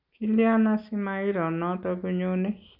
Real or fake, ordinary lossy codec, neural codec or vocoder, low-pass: real; none; none; 5.4 kHz